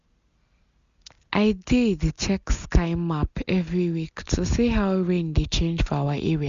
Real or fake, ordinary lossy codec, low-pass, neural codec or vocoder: real; Opus, 64 kbps; 7.2 kHz; none